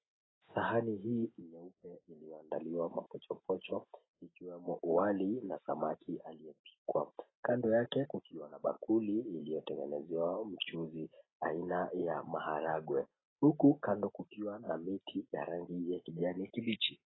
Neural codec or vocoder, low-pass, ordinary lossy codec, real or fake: none; 7.2 kHz; AAC, 16 kbps; real